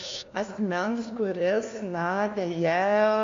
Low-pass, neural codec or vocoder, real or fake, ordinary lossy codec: 7.2 kHz; codec, 16 kHz, 1 kbps, FunCodec, trained on LibriTTS, 50 frames a second; fake; AAC, 48 kbps